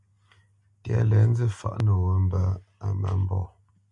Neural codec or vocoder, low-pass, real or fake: none; 10.8 kHz; real